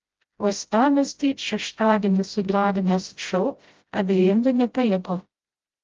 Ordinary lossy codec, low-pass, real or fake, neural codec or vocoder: Opus, 32 kbps; 7.2 kHz; fake; codec, 16 kHz, 0.5 kbps, FreqCodec, smaller model